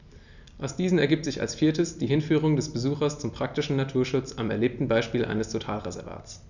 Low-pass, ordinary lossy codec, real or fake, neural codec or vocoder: 7.2 kHz; none; real; none